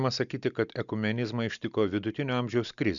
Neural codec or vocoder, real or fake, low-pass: none; real; 7.2 kHz